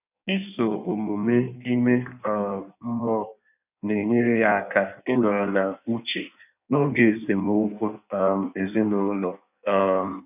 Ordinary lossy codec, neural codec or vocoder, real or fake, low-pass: none; codec, 16 kHz in and 24 kHz out, 1.1 kbps, FireRedTTS-2 codec; fake; 3.6 kHz